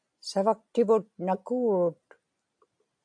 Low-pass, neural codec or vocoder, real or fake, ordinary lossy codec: 9.9 kHz; none; real; AAC, 64 kbps